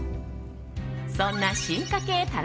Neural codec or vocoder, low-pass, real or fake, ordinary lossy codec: none; none; real; none